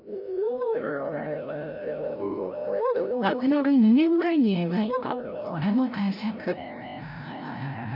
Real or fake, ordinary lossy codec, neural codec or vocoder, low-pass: fake; none; codec, 16 kHz, 0.5 kbps, FreqCodec, larger model; 5.4 kHz